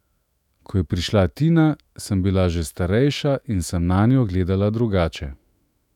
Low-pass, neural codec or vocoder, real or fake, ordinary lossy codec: 19.8 kHz; autoencoder, 48 kHz, 128 numbers a frame, DAC-VAE, trained on Japanese speech; fake; none